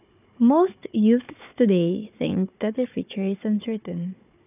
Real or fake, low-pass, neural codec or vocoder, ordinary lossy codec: fake; 3.6 kHz; codec, 16 kHz, 4 kbps, FunCodec, trained on Chinese and English, 50 frames a second; none